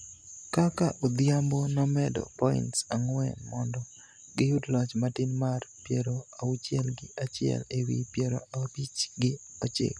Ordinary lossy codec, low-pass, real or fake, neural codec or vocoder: none; none; real; none